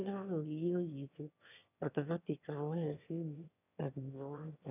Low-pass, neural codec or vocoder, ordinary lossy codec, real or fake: 3.6 kHz; autoencoder, 22.05 kHz, a latent of 192 numbers a frame, VITS, trained on one speaker; none; fake